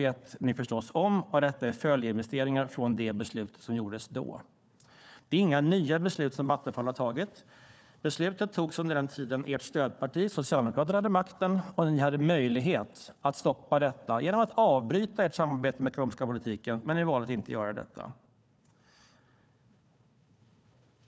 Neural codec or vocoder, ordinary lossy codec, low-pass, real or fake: codec, 16 kHz, 4 kbps, FunCodec, trained on Chinese and English, 50 frames a second; none; none; fake